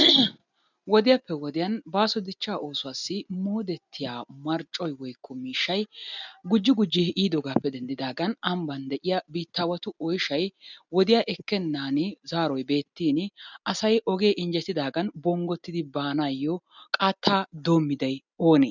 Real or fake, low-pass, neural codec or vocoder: real; 7.2 kHz; none